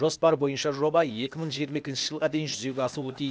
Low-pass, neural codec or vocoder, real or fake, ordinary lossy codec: none; codec, 16 kHz, 0.8 kbps, ZipCodec; fake; none